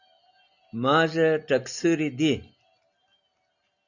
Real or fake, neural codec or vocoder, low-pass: real; none; 7.2 kHz